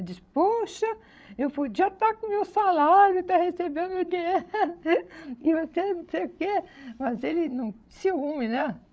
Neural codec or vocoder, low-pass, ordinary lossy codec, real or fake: codec, 16 kHz, 16 kbps, FreqCodec, larger model; none; none; fake